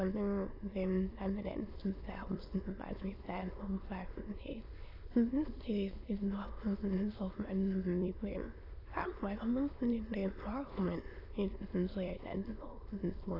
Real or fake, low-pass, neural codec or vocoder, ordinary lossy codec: fake; 5.4 kHz; autoencoder, 22.05 kHz, a latent of 192 numbers a frame, VITS, trained on many speakers; AAC, 24 kbps